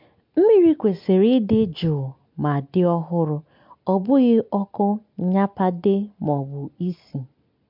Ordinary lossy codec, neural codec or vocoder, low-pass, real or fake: MP3, 48 kbps; none; 5.4 kHz; real